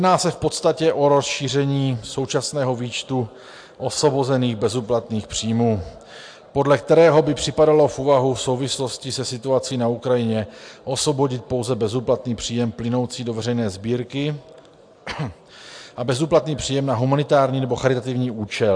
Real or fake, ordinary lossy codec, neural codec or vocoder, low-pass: real; AAC, 64 kbps; none; 9.9 kHz